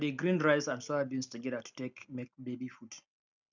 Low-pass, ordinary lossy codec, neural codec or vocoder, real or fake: 7.2 kHz; none; none; real